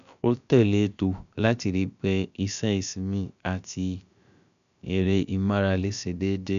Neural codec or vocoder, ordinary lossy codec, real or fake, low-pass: codec, 16 kHz, about 1 kbps, DyCAST, with the encoder's durations; none; fake; 7.2 kHz